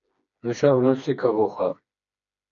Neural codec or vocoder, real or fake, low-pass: codec, 16 kHz, 2 kbps, FreqCodec, smaller model; fake; 7.2 kHz